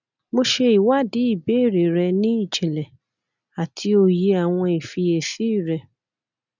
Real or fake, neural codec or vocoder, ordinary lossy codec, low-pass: real; none; none; 7.2 kHz